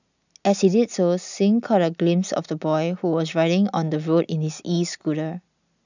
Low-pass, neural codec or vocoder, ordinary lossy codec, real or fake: 7.2 kHz; none; none; real